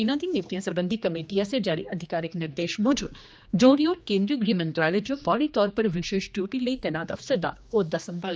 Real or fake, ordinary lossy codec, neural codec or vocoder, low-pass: fake; none; codec, 16 kHz, 2 kbps, X-Codec, HuBERT features, trained on general audio; none